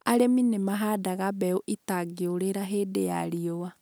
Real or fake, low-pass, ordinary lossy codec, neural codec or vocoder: real; none; none; none